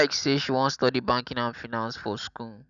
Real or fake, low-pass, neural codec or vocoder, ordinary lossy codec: real; 7.2 kHz; none; none